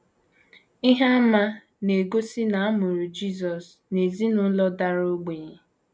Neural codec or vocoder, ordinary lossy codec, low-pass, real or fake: none; none; none; real